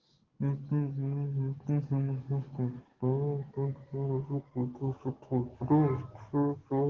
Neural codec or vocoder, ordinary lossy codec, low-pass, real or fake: codec, 44.1 kHz, 7.8 kbps, DAC; Opus, 16 kbps; 7.2 kHz; fake